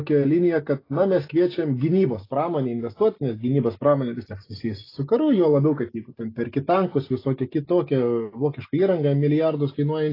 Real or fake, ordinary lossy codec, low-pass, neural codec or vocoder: real; AAC, 24 kbps; 5.4 kHz; none